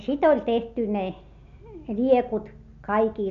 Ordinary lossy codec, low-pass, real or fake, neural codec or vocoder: AAC, 96 kbps; 7.2 kHz; real; none